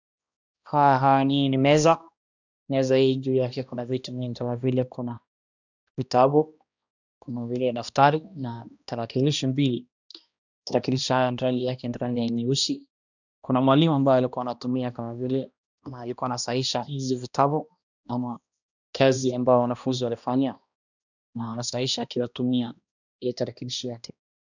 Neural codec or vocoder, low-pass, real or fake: codec, 16 kHz, 1 kbps, X-Codec, HuBERT features, trained on balanced general audio; 7.2 kHz; fake